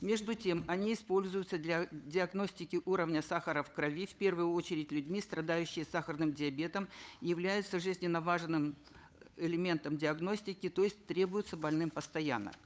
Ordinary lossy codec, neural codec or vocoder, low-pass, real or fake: none; codec, 16 kHz, 8 kbps, FunCodec, trained on Chinese and English, 25 frames a second; none; fake